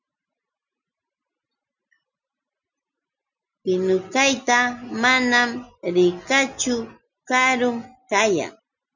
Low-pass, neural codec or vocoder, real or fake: 7.2 kHz; none; real